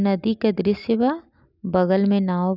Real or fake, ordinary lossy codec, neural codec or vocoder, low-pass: real; none; none; 5.4 kHz